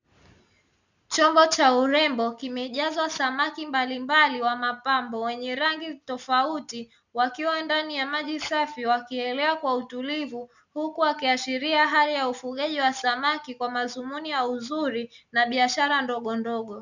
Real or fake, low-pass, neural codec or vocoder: real; 7.2 kHz; none